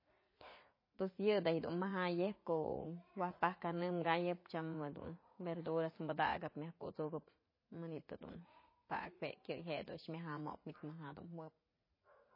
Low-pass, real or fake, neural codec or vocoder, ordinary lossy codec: 5.4 kHz; fake; vocoder, 44.1 kHz, 80 mel bands, Vocos; MP3, 24 kbps